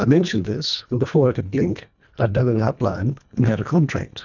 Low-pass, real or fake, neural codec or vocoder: 7.2 kHz; fake; codec, 24 kHz, 1.5 kbps, HILCodec